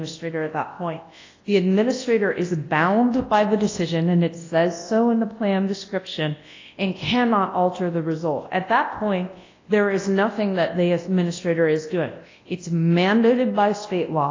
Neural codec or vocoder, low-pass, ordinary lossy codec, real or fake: codec, 24 kHz, 0.9 kbps, WavTokenizer, large speech release; 7.2 kHz; AAC, 32 kbps; fake